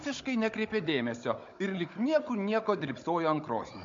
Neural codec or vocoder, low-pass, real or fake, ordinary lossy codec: codec, 16 kHz, 16 kbps, FunCodec, trained on Chinese and English, 50 frames a second; 7.2 kHz; fake; MP3, 48 kbps